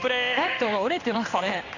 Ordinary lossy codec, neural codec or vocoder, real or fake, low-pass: none; codec, 16 kHz in and 24 kHz out, 1 kbps, XY-Tokenizer; fake; 7.2 kHz